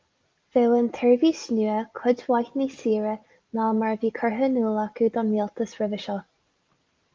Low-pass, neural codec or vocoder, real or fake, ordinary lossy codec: 7.2 kHz; none; real; Opus, 32 kbps